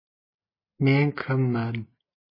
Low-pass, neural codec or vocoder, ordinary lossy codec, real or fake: 5.4 kHz; none; MP3, 24 kbps; real